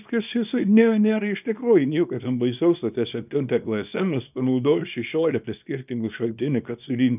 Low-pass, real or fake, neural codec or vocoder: 3.6 kHz; fake; codec, 24 kHz, 0.9 kbps, WavTokenizer, small release